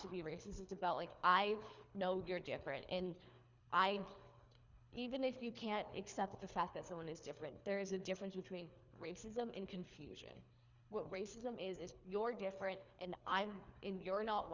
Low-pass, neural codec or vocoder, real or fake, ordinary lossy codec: 7.2 kHz; codec, 24 kHz, 3 kbps, HILCodec; fake; Opus, 64 kbps